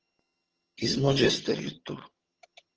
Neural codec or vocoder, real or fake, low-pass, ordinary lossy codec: vocoder, 22.05 kHz, 80 mel bands, HiFi-GAN; fake; 7.2 kHz; Opus, 16 kbps